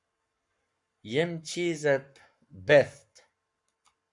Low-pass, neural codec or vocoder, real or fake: 10.8 kHz; codec, 44.1 kHz, 7.8 kbps, Pupu-Codec; fake